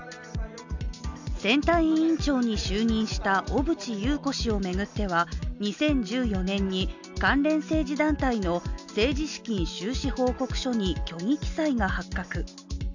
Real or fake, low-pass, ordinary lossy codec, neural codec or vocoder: real; 7.2 kHz; none; none